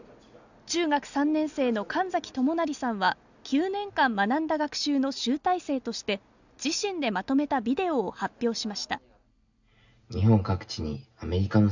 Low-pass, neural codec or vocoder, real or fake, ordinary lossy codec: 7.2 kHz; none; real; none